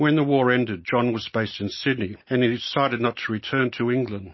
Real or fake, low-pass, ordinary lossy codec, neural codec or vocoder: real; 7.2 kHz; MP3, 24 kbps; none